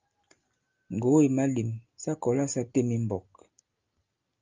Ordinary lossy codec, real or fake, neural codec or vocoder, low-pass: Opus, 24 kbps; real; none; 7.2 kHz